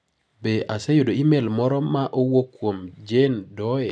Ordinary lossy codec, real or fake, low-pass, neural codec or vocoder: none; real; none; none